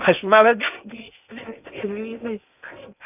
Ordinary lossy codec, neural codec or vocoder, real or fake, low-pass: none; codec, 16 kHz in and 24 kHz out, 0.6 kbps, FocalCodec, streaming, 2048 codes; fake; 3.6 kHz